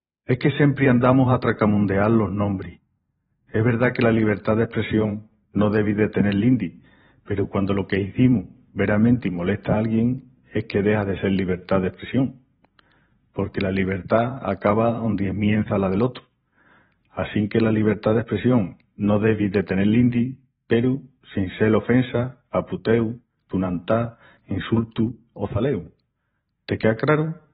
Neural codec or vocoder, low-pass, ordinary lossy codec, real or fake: vocoder, 44.1 kHz, 128 mel bands every 512 samples, BigVGAN v2; 19.8 kHz; AAC, 16 kbps; fake